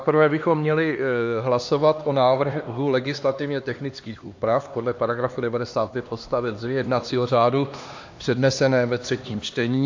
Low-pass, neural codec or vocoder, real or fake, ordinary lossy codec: 7.2 kHz; codec, 16 kHz, 2 kbps, X-Codec, HuBERT features, trained on LibriSpeech; fake; AAC, 48 kbps